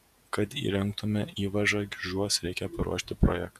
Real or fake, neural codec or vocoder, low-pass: fake; vocoder, 48 kHz, 128 mel bands, Vocos; 14.4 kHz